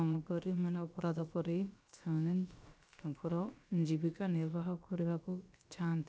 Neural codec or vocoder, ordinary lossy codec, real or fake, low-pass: codec, 16 kHz, about 1 kbps, DyCAST, with the encoder's durations; none; fake; none